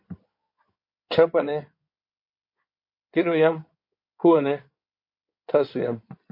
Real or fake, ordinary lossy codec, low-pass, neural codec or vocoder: fake; MP3, 32 kbps; 5.4 kHz; codec, 16 kHz in and 24 kHz out, 2.2 kbps, FireRedTTS-2 codec